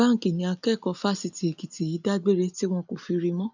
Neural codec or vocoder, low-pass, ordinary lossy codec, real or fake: none; 7.2 kHz; none; real